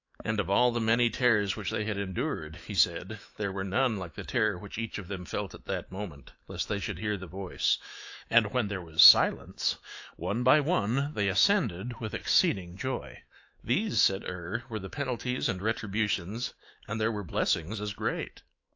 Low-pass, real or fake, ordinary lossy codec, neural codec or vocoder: 7.2 kHz; fake; AAC, 48 kbps; autoencoder, 48 kHz, 128 numbers a frame, DAC-VAE, trained on Japanese speech